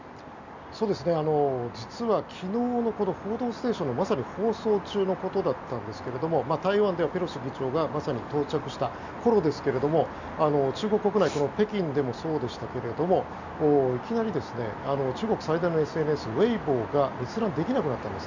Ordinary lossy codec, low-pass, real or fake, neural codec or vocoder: none; 7.2 kHz; real; none